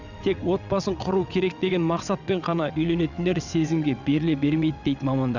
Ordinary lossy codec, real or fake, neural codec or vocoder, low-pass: none; fake; vocoder, 22.05 kHz, 80 mel bands, WaveNeXt; 7.2 kHz